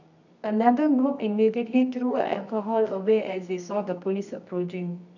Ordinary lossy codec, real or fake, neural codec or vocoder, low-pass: none; fake; codec, 24 kHz, 0.9 kbps, WavTokenizer, medium music audio release; 7.2 kHz